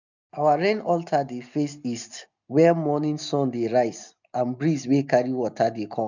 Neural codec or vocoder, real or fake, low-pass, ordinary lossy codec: none; real; 7.2 kHz; none